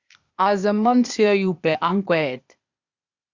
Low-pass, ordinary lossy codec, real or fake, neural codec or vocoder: 7.2 kHz; Opus, 64 kbps; fake; codec, 16 kHz, 0.8 kbps, ZipCodec